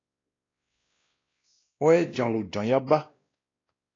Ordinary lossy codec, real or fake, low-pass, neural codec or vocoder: AAC, 32 kbps; fake; 7.2 kHz; codec, 16 kHz, 1 kbps, X-Codec, WavLM features, trained on Multilingual LibriSpeech